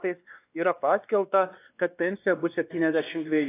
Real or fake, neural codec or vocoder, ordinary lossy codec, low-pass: fake; codec, 16 kHz, 2 kbps, X-Codec, WavLM features, trained on Multilingual LibriSpeech; AAC, 24 kbps; 3.6 kHz